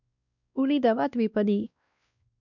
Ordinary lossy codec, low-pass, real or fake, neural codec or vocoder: none; 7.2 kHz; fake; codec, 16 kHz, 1 kbps, X-Codec, WavLM features, trained on Multilingual LibriSpeech